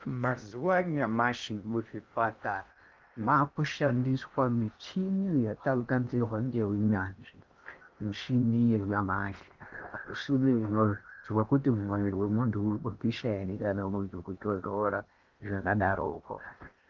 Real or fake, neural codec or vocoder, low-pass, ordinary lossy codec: fake; codec, 16 kHz in and 24 kHz out, 0.6 kbps, FocalCodec, streaming, 2048 codes; 7.2 kHz; Opus, 32 kbps